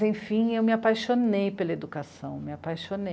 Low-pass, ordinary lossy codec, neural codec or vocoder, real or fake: none; none; none; real